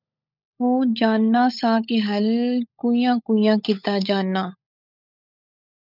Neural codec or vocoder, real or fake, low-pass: codec, 16 kHz, 16 kbps, FunCodec, trained on LibriTTS, 50 frames a second; fake; 5.4 kHz